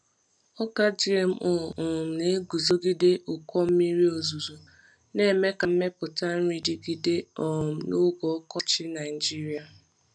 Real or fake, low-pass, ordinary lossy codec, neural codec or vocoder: real; 9.9 kHz; none; none